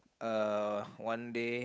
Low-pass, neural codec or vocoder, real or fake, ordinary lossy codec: none; codec, 16 kHz, 8 kbps, FunCodec, trained on Chinese and English, 25 frames a second; fake; none